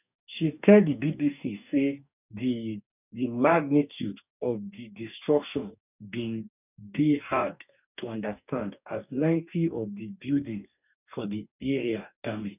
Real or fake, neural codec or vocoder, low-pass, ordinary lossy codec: fake; codec, 44.1 kHz, 2.6 kbps, DAC; 3.6 kHz; none